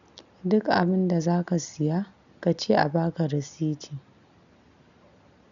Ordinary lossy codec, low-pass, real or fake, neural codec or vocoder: none; 7.2 kHz; real; none